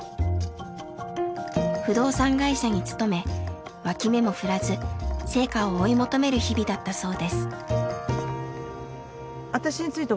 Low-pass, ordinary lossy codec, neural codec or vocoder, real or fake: none; none; none; real